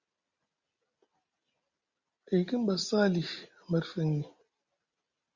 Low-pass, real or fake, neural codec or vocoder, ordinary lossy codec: 7.2 kHz; real; none; Opus, 64 kbps